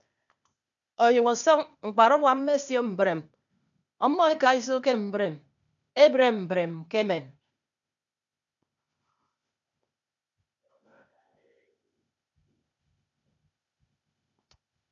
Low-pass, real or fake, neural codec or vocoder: 7.2 kHz; fake; codec, 16 kHz, 0.8 kbps, ZipCodec